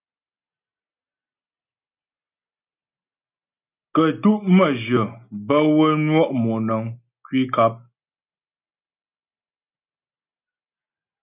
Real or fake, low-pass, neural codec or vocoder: real; 3.6 kHz; none